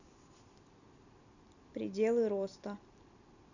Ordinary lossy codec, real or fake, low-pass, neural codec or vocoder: none; fake; 7.2 kHz; vocoder, 44.1 kHz, 128 mel bands every 256 samples, BigVGAN v2